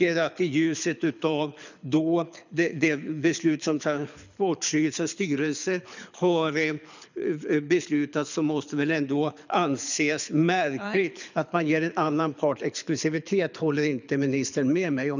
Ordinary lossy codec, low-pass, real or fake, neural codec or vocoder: none; 7.2 kHz; fake; codec, 24 kHz, 6 kbps, HILCodec